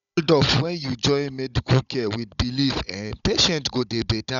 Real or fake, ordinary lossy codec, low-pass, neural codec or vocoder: fake; none; 7.2 kHz; codec, 16 kHz, 16 kbps, FunCodec, trained on Chinese and English, 50 frames a second